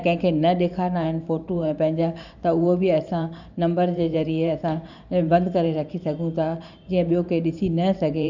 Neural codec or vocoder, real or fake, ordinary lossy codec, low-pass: vocoder, 44.1 kHz, 128 mel bands every 512 samples, BigVGAN v2; fake; none; 7.2 kHz